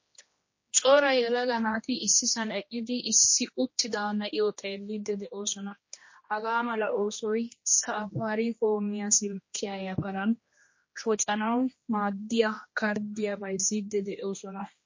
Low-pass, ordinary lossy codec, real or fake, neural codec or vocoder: 7.2 kHz; MP3, 32 kbps; fake; codec, 16 kHz, 1 kbps, X-Codec, HuBERT features, trained on general audio